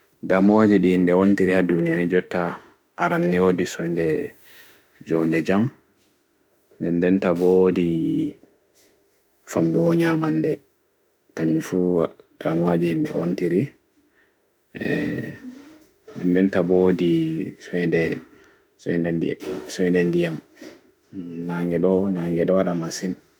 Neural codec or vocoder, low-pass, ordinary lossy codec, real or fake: autoencoder, 48 kHz, 32 numbers a frame, DAC-VAE, trained on Japanese speech; none; none; fake